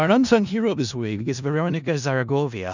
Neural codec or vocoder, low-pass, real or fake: codec, 16 kHz in and 24 kHz out, 0.4 kbps, LongCat-Audio-Codec, four codebook decoder; 7.2 kHz; fake